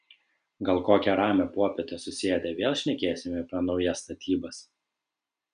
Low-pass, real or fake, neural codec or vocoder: 9.9 kHz; real; none